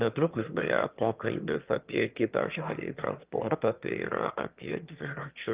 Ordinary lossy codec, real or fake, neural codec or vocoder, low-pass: Opus, 32 kbps; fake; autoencoder, 22.05 kHz, a latent of 192 numbers a frame, VITS, trained on one speaker; 3.6 kHz